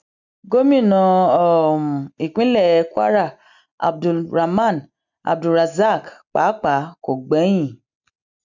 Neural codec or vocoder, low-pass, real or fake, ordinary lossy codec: none; 7.2 kHz; real; none